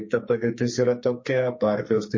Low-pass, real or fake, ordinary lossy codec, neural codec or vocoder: 7.2 kHz; fake; MP3, 32 kbps; codec, 44.1 kHz, 2.6 kbps, SNAC